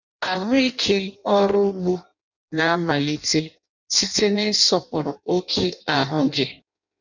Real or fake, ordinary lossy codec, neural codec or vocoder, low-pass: fake; none; codec, 16 kHz in and 24 kHz out, 0.6 kbps, FireRedTTS-2 codec; 7.2 kHz